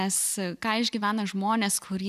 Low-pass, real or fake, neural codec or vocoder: 14.4 kHz; real; none